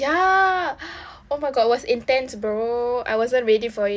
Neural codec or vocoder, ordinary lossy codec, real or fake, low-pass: none; none; real; none